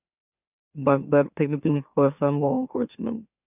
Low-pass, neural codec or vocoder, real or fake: 3.6 kHz; autoencoder, 44.1 kHz, a latent of 192 numbers a frame, MeloTTS; fake